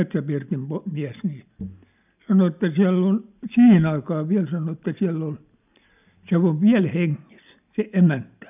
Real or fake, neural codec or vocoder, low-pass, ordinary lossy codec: real; none; 3.6 kHz; none